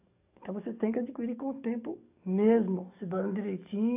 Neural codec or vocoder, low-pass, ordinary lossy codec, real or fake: codec, 44.1 kHz, 7.8 kbps, DAC; 3.6 kHz; AAC, 24 kbps; fake